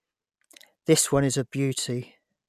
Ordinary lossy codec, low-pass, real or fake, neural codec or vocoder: none; 14.4 kHz; real; none